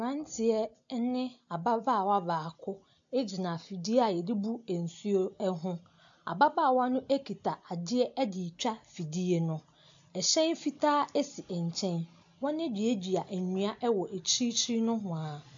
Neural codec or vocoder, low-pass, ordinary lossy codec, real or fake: none; 7.2 kHz; AAC, 64 kbps; real